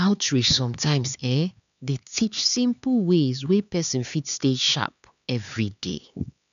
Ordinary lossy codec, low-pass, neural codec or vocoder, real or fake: none; 7.2 kHz; codec, 16 kHz, 4 kbps, X-Codec, HuBERT features, trained on LibriSpeech; fake